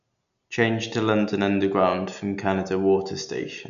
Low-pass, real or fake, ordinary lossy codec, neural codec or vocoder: 7.2 kHz; real; none; none